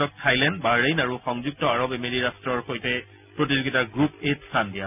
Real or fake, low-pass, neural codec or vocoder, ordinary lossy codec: real; 3.6 kHz; none; none